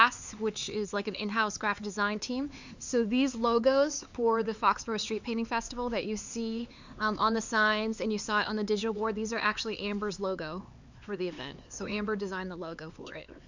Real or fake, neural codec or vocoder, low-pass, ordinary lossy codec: fake; codec, 16 kHz, 4 kbps, X-Codec, HuBERT features, trained on LibriSpeech; 7.2 kHz; Opus, 64 kbps